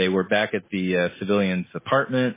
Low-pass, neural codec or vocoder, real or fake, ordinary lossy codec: 3.6 kHz; none; real; MP3, 16 kbps